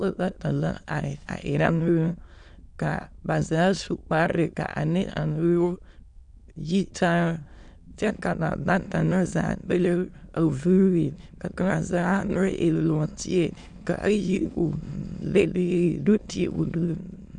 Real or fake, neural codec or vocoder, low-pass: fake; autoencoder, 22.05 kHz, a latent of 192 numbers a frame, VITS, trained on many speakers; 9.9 kHz